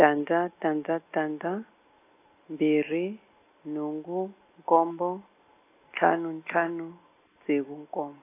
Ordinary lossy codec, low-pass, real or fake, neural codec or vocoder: AAC, 24 kbps; 3.6 kHz; real; none